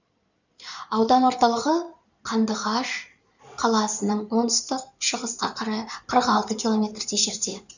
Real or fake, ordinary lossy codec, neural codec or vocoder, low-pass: fake; none; codec, 16 kHz in and 24 kHz out, 2.2 kbps, FireRedTTS-2 codec; 7.2 kHz